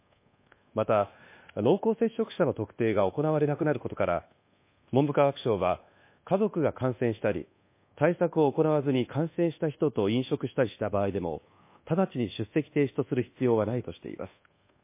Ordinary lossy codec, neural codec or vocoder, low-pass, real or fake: MP3, 24 kbps; codec, 24 kHz, 1.2 kbps, DualCodec; 3.6 kHz; fake